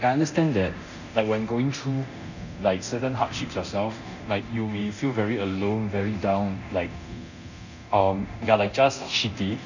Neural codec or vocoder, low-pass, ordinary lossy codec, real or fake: codec, 24 kHz, 0.9 kbps, DualCodec; 7.2 kHz; none; fake